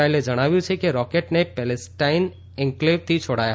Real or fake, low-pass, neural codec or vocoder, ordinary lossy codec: real; none; none; none